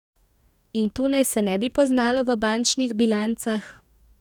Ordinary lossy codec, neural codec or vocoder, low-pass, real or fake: none; codec, 44.1 kHz, 2.6 kbps, DAC; 19.8 kHz; fake